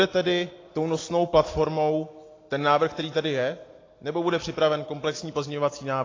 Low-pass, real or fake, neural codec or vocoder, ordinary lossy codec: 7.2 kHz; real; none; AAC, 32 kbps